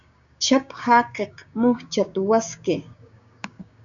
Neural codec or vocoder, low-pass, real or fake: codec, 16 kHz, 6 kbps, DAC; 7.2 kHz; fake